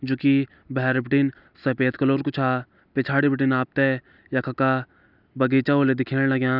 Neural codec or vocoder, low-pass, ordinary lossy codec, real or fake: none; 5.4 kHz; none; real